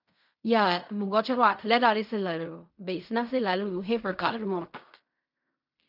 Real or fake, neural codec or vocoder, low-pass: fake; codec, 16 kHz in and 24 kHz out, 0.4 kbps, LongCat-Audio-Codec, fine tuned four codebook decoder; 5.4 kHz